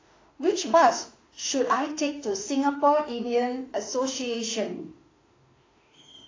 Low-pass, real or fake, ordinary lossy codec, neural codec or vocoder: 7.2 kHz; fake; AAC, 32 kbps; autoencoder, 48 kHz, 32 numbers a frame, DAC-VAE, trained on Japanese speech